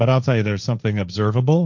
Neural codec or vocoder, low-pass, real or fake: codec, 16 kHz, 1.1 kbps, Voila-Tokenizer; 7.2 kHz; fake